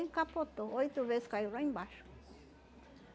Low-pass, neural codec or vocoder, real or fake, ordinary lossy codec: none; none; real; none